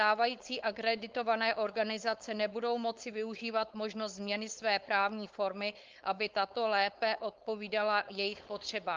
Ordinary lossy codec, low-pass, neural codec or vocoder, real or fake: Opus, 32 kbps; 7.2 kHz; codec, 16 kHz, 4.8 kbps, FACodec; fake